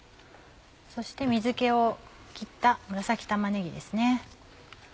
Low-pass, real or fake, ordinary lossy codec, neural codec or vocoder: none; real; none; none